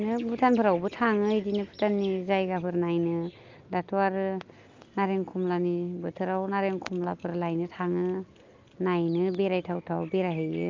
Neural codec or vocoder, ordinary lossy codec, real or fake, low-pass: none; Opus, 24 kbps; real; 7.2 kHz